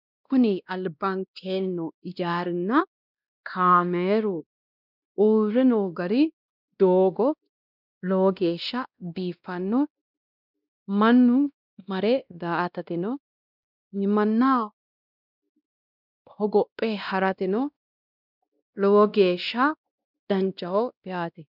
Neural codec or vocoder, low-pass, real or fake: codec, 16 kHz, 1 kbps, X-Codec, WavLM features, trained on Multilingual LibriSpeech; 5.4 kHz; fake